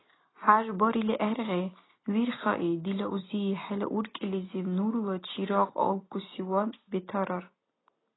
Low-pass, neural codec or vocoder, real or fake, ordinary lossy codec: 7.2 kHz; none; real; AAC, 16 kbps